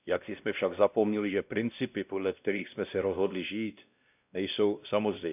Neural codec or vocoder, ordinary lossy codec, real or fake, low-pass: codec, 16 kHz, 1 kbps, X-Codec, WavLM features, trained on Multilingual LibriSpeech; none; fake; 3.6 kHz